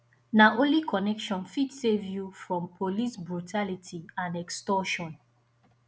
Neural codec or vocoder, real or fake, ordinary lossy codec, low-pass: none; real; none; none